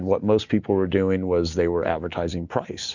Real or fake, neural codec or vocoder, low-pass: real; none; 7.2 kHz